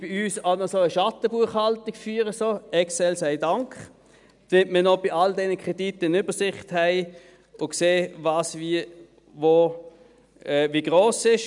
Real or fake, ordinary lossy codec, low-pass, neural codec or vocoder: real; none; 10.8 kHz; none